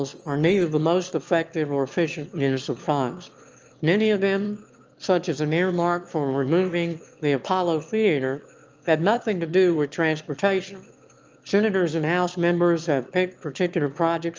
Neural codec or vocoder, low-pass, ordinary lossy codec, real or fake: autoencoder, 22.05 kHz, a latent of 192 numbers a frame, VITS, trained on one speaker; 7.2 kHz; Opus, 24 kbps; fake